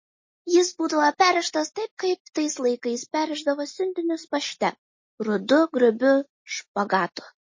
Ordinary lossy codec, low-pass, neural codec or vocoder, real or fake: MP3, 32 kbps; 7.2 kHz; none; real